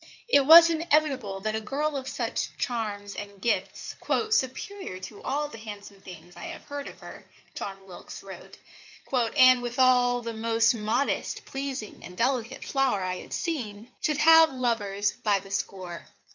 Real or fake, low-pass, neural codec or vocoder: fake; 7.2 kHz; codec, 16 kHz in and 24 kHz out, 2.2 kbps, FireRedTTS-2 codec